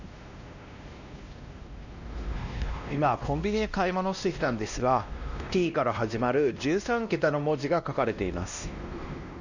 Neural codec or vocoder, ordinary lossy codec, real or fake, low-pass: codec, 16 kHz, 1 kbps, X-Codec, WavLM features, trained on Multilingual LibriSpeech; none; fake; 7.2 kHz